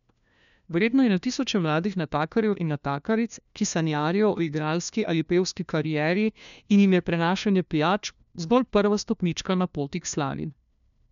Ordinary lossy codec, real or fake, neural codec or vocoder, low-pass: none; fake; codec, 16 kHz, 1 kbps, FunCodec, trained on LibriTTS, 50 frames a second; 7.2 kHz